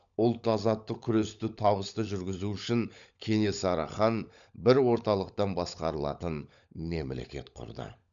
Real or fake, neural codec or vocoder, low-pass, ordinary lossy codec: fake; codec, 16 kHz, 16 kbps, FunCodec, trained on LibriTTS, 50 frames a second; 7.2 kHz; none